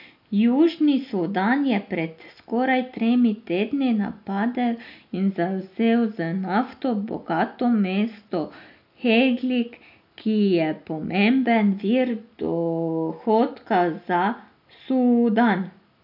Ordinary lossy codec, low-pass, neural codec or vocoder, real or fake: AAC, 48 kbps; 5.4 kHz; none; real